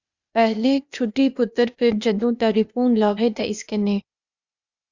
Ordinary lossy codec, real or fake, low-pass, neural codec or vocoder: Opus, 64 kbps; fake; 7.2 kHz; codec, 16 kHz, 0.8 kbps, ZipCodec